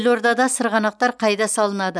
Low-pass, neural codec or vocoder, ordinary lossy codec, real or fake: none; none; none; real